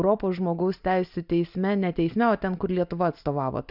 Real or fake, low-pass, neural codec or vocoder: real; 5.4 kHz; none